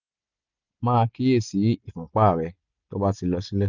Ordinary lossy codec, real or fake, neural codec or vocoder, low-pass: none; real; none; 7.2 kHz